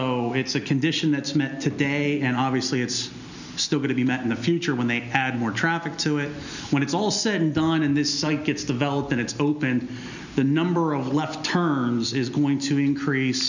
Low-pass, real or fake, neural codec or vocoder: 7.2 kHz; real; none